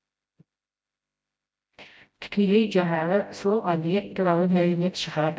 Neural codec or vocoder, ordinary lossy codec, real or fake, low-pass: codec, 16 kHz, 0.5 kbps, FreqCodec, smaller model; none; fake; none